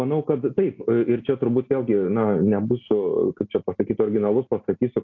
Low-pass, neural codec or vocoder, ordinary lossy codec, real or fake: 7.2 kHz; none; AAC, 48 kbps; real